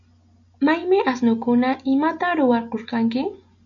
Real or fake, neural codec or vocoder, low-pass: real; none; 7.2 kHz